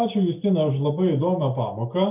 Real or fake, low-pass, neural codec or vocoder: real; 3.6 kHz; none